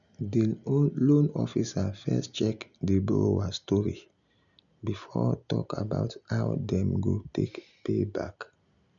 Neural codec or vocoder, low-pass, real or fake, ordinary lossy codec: none; 7.2 kHz; real; none